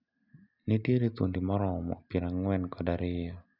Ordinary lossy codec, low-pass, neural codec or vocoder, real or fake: none; 5.4 kHz; none; real